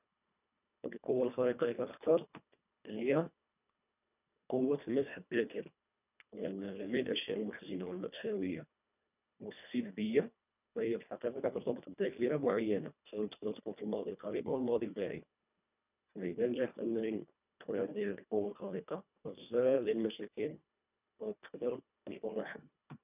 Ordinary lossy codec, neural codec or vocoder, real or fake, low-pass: none; codec, 24 kHz, 1.5 kbps, HILCodec; fake; 3.6 kHz